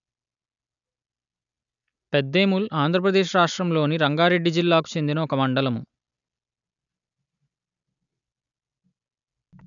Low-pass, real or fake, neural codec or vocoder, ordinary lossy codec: 7.2 kHz; real; none; none